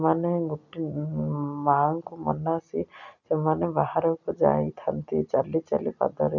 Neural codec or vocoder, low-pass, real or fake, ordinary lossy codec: none; 7.2 kHz; real; none